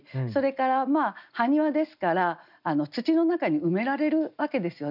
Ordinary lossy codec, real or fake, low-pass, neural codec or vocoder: none; real; 5.4 kHz; none